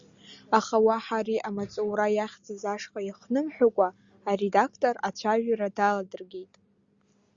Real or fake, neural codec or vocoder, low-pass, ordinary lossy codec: real; none; 7.2 kHz; Opus, 64 kbps